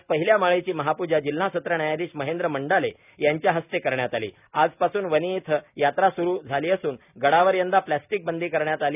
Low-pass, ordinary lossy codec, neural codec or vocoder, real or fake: 3.6 kHz; none; none; real